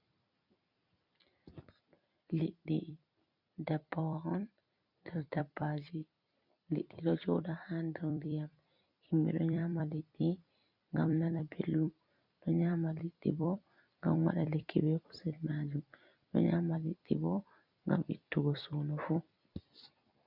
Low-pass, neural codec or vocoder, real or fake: 5.4 kHz; vocoder, 44.1 kHz, 128 mel bands every 256 samples, BigVGAN v2; fake